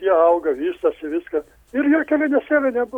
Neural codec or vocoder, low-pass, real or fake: none; 19.8 kHz; real